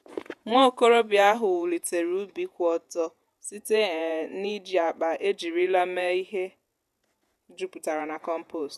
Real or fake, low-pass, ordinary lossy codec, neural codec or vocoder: fake; 14.4 kHz; MP3, 96 kbps; vocoder, 48 kHz, 128 mel bands, Vocos